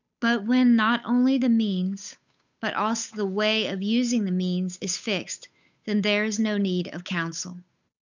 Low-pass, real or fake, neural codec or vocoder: 7.2 kHz; fake; codec, 16 kHz, 8 kbps, FunCodec, trained on Chinese and English, 25 frames a second